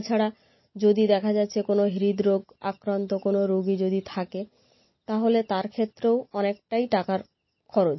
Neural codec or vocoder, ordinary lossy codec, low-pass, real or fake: none; MP3, 24 kbps; 7.2 kHz; real